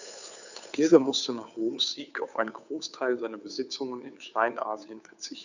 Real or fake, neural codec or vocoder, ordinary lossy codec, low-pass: fake; codec, 16 kHz, 2 kbps, FunCodec, trained on Chinese and English, 25 frames a second; none; 7.2 kHz